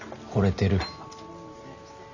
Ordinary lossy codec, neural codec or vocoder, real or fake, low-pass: none; none; real; 7.2 kHz